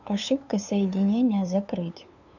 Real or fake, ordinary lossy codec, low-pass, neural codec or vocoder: fake; none; 7.2 kHz; codec, 16 kHz, 2 kbps, FunCodec, trained on LibriTTS, 25 frames a second